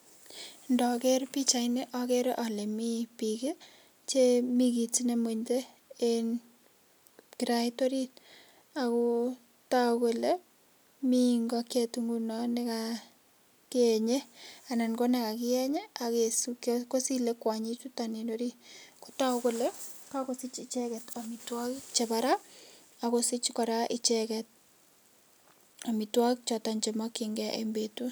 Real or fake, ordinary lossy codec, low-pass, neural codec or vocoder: real; none; none; none